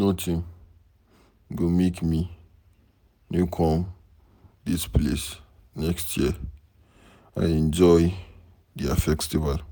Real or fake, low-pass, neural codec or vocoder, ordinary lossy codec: real; none; none; none